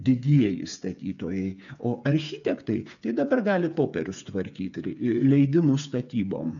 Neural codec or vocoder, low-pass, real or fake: codec, 16 kHz, 8 kbps, FreqCodec, smaller model; 7.2 kHz; fake